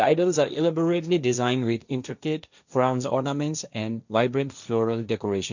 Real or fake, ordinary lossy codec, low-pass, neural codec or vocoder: fake; none; 7.2 kHz; codec, 16 kHz, 1.1 kbps, Voila-Tokenizer